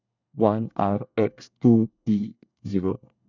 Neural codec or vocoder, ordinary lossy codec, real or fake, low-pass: codec, 24 kHz, 1 kbps, SNAC; none; fake; 7.2 kHz